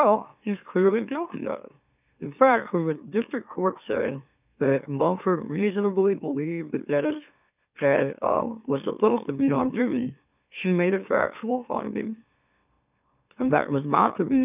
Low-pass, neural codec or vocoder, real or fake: 3.6 kHz; autoencoder, 44.1 kHz, a latent of 192 numbers a frame, MeloTTS; fake